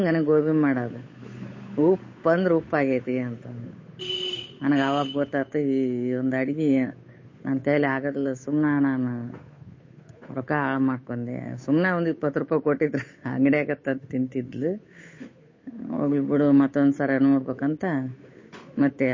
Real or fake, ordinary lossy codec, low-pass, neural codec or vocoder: fake; MP3, 32 kbps; 7.2 kHz; codec, 16 kHz, 8 kbps, FunCodec, trained on Chinese and English, 25 frames a second